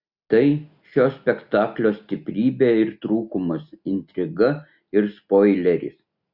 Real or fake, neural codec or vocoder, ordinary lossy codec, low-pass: real; none; Opus, 64 kbps; 5.4 kHz